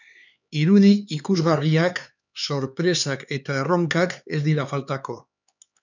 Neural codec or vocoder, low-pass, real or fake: codec, 16 kHz, 4 kbps, X-Codec, HuBERT features, trained on LibriSpeech; 7.2 kHz; fake